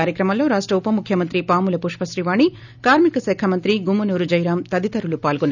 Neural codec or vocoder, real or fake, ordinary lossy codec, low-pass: none; real; none; 7.2 kHz